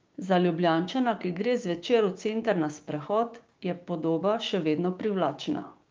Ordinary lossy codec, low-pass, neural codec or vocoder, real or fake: Opus, 24 kbps; 7.2 kHz; codec, 16 kHz, 6 kbps, DAC; fake